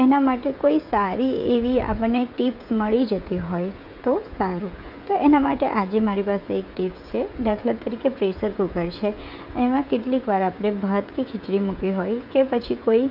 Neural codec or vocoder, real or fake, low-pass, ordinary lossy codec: codec, 16 kHz, 16 kbps, FreqCodec, smaller model; fake; 5.4 kHz; none